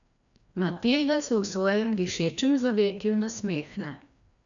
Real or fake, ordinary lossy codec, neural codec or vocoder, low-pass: fake; none; codec, 16 kHz, 1 kbps, FreqCodec, larger model; 7.2 kHz